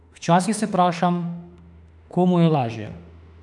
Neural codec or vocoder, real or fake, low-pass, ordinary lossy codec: autoencoder, 48 kHz, 32 numbers a frame, DAC-VAE, trained on Japanese speech; fake; 10.8 kHz; none